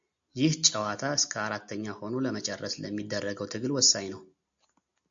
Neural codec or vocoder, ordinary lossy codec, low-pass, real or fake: none; AAC, 64 kbps; 7.2 kHz; real